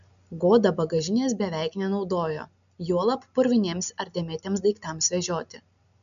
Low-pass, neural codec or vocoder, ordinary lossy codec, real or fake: 7.2 kHz; none; MP3, 96 kbps; real